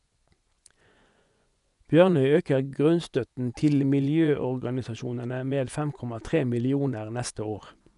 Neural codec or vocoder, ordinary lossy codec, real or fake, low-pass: vocoder, 24 kHz, 100 mel bands, Vocos; none; fake; 10.8 kHz